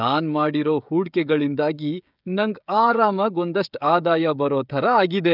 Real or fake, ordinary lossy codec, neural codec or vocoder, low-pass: fake; none; codec, 16 kHz, 16 kbps, FreqCodec, smaller model; 5.4 kHz